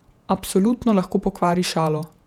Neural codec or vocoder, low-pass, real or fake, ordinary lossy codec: vocoder, 48 kHz, 128 mel bands, Vocos; 19.8 kHz; fake; none